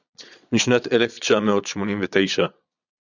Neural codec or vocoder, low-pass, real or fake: vocoder, 22.05 kHz, 80 mel bands, Vocos; 7.2 kHz; fake